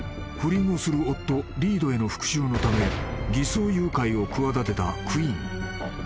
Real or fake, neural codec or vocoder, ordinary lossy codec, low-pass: real; none; none; none